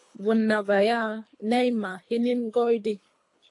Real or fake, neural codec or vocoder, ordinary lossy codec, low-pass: fake; codec, 24 kHz, 3 kbps, HILCodec; AAC, 48 kbps; 10.8 kHz